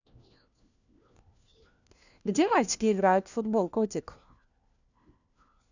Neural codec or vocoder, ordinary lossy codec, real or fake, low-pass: codec, 16 kHz, 1 kbps, FunCodec, trained on LibriTTS, 50 frames a second; none; fake; 7.2 kHz